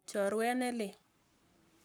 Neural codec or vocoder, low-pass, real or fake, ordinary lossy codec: codec, 44.1 kHz, 7.8 kbps, Pupu-Codec; none; fake; none